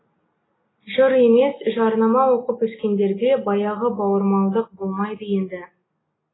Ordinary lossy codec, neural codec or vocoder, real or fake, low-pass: AAC, 16 kbps; none; real; 7.2 kHz